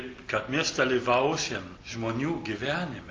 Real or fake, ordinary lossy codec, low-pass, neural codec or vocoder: real; Opus, 32 kbps; 7.2 kHz; none